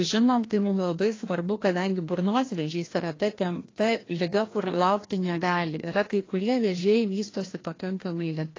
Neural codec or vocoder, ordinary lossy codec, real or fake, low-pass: codec, 16 kHz, 1 kbps, FreqCodec, larger model; AAC, 32 kbps; fake; 7.2 kHz